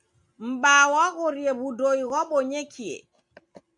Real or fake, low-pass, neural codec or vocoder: real; 10.8 kHz; none